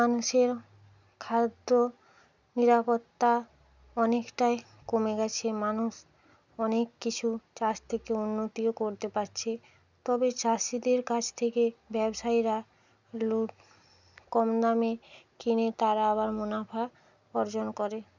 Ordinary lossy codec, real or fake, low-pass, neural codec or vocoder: none; real; 7.2 kHz; none